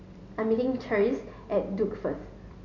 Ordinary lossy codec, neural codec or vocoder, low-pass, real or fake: none; none; 7.2 kHz; real